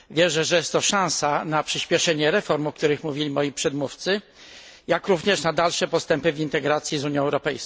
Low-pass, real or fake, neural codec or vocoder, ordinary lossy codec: none; real; none; none